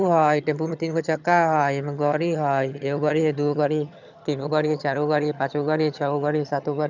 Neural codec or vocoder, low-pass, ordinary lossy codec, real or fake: vocoder, 22.05 kHz, 80 mel bands, HiFi-GAN; 7.2 kHz; none; fake